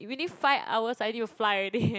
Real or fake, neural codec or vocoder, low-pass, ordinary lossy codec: real; none; none; none